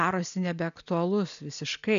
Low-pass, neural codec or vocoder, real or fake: 7.2 kHz; none; real